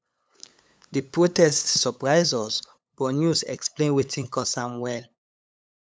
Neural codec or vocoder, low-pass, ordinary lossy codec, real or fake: codec, 16 kHz, 8 kbps, FunCodec, trained on LibriTTS, 25 frames a second; none; none; fake